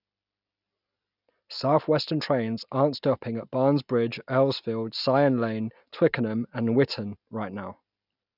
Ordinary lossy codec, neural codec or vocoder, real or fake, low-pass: none; none; real; 5.4 kHz